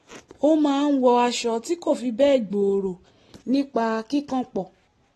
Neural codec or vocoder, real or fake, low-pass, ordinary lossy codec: none; real; 19.8 kHz; AAC, 32 kbps